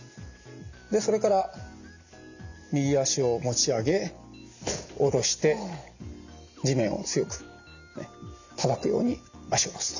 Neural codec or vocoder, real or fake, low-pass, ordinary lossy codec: none; real; 7.2 kHz; none